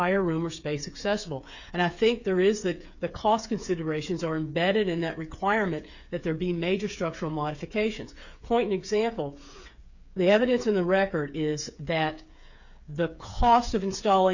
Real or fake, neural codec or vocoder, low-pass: fake; codec, 16 kHz, 8 kbps, FreqCodec, smaller model; 7.2 kHz